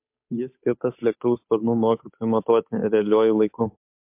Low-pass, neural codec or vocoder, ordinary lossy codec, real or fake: 3.6 kHz; codec, 16 kHz, 8 kbps, FunCodec, trained on Chinese and English, 25 frames a second; MP3, 32 kbps; fake